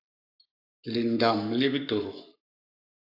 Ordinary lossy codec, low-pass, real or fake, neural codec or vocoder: AAC, 48 kbps; 5.4 kHz; fake; codec, 44.1 kHz, 7.8 kbps, Pupu-Codec